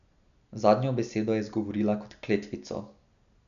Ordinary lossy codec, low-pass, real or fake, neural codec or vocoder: none; 7.2 kHz; real; none